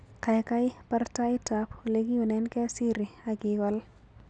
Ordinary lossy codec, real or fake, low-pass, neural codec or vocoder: none; real; 9.9 kHz; none